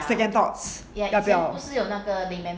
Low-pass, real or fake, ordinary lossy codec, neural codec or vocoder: none; real; none; none